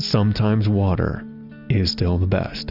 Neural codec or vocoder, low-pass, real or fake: none; 5.4 kHz; real